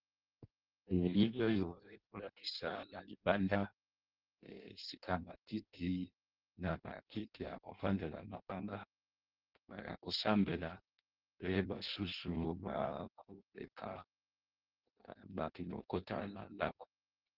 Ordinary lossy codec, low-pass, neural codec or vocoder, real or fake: Opus, 16 kbps; 5.4 kHz; codec, 16 kHz in and 24 kHz out, 0.6 kbps, FireRedTTS-2 codec; fake